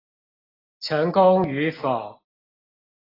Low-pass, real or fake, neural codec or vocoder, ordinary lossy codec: 5.4 kHz; real; none; AAC, 24 kbps